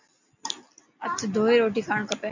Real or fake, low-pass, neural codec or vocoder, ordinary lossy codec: real; 7.2 kHz; none; AAC, 48 kbps